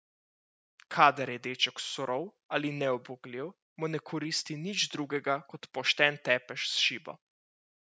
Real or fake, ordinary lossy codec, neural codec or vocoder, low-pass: real; none; none; none